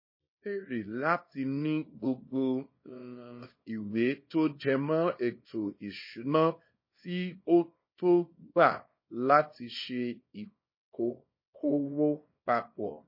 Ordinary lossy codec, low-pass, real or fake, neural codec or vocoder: MP3, 24 kbps; 5.4 kHz; fake; codec, 24 kHz, 0.9 kbps, WavTokenizer, small release